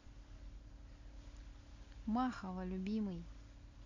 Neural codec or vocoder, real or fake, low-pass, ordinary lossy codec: none; real; 7.2 kHz; AAC, 48 kbps